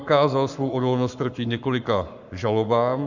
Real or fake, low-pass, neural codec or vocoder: fake; 7.2 kHz; codec, 44.1 kHz, 7.8 kbps, Pupu-Codec